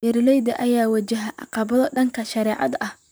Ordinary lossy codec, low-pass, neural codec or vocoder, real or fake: none; none; vocoder, 44.1 kHz, 128 mel bands, Pupu-Vocoder; fake